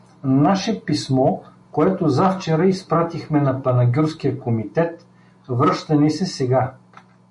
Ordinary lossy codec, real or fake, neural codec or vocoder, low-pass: MP3, 48 kbps; real; none; 10.8 kHz